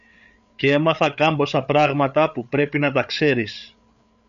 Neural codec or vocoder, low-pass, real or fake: codec, 16 kHz, 8 kbps, FreqCodec, larger model; 7.2 kHz; fake